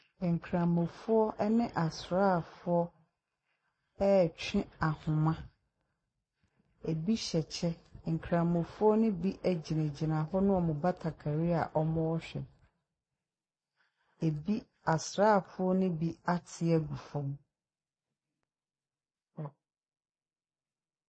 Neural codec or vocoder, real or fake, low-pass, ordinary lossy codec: none; real; 9.9 kHz; MP3, 32 kbps